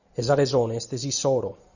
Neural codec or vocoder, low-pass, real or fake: none; 7.2 kHz; real